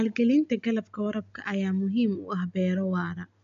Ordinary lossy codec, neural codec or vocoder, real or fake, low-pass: MP3, 64 kbps; none; real; 7.2 kHz